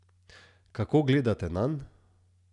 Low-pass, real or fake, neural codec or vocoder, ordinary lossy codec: 10.8 kHz; real; none; none